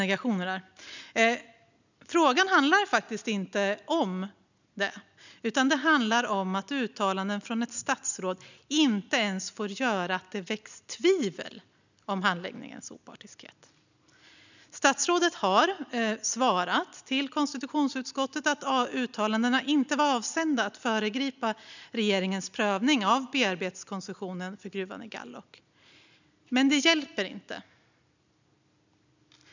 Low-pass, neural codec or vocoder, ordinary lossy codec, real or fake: 7.2 kHz; none; none; real